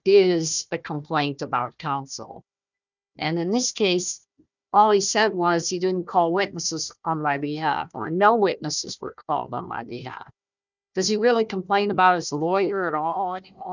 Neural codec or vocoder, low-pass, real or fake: codec, 16 kHz, 1 kbps, FunCodec, trained on Chinese and English, 50 frames a second; 7.2 kHz; fake